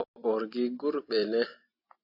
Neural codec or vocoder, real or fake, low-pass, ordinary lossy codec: none; real; 5.4 kHz; AAC, 32 kbps